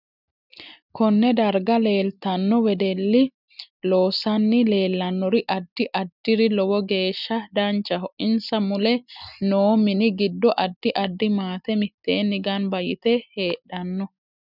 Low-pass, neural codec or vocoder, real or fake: 5.4 kHz; none; real